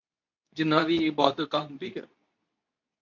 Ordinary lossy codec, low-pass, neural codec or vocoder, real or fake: AAC, 48 kbps; 7.2 kHz; codec, 24 kHz, 0.9 kbps, WavTokenizer, medium speech release version 2; fake